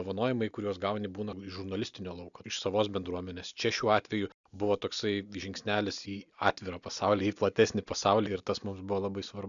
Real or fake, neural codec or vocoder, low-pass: real; none; 7.2 kHz